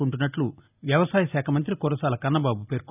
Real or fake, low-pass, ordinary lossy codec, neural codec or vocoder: real; 3.6 kHz; none; none